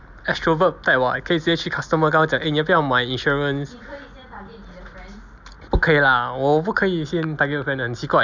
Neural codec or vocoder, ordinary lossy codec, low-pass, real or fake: none; none; 7.2 kHz; real